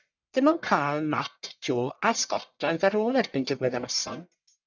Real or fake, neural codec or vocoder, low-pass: fake; codec, 44.1 kHz, 1.7 kbps, Pupu-Codec; 7.2 kHz